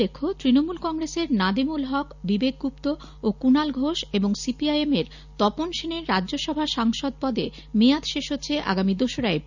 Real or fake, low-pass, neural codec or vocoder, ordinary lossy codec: real; 7.2 kHz; none; none